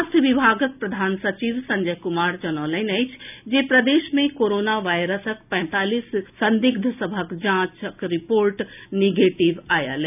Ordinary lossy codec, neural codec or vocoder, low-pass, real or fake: none; none; 3.6 kHz; real